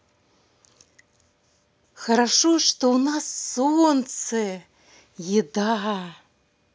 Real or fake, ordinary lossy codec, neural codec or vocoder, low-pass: real; none; none; none